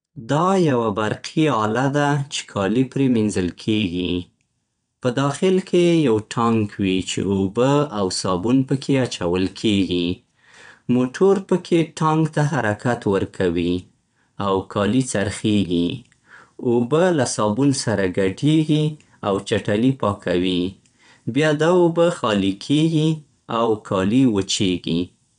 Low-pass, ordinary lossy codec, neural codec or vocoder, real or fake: 9.9 kHz; none; vocoder, 22.05 kHz, 80 mel bands, WaveNeXt; fake